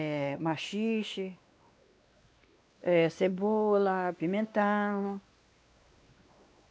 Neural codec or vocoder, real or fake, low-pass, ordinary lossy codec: codec, 16 kHz, 2 kbps, X-Codec, WavLM features, trained on Multilingual LibriSpeech; fake; none; none